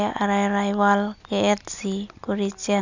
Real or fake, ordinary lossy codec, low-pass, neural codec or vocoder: real; none; 7.2 kHz; none